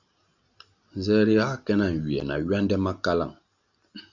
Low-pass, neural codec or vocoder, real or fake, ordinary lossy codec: 7.2 kHz; none; real; Opus, 64 kbps